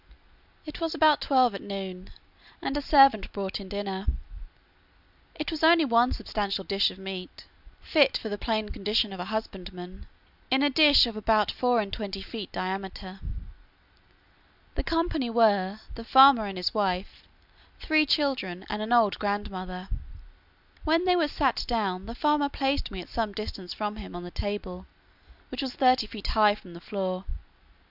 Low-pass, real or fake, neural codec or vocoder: 5.4 kHz; real; none